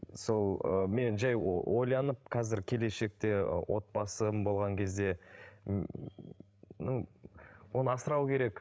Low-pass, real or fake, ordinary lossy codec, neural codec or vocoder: none; fake; none; codec, 16 kHz, 16 kbps, FreqCodec, larger model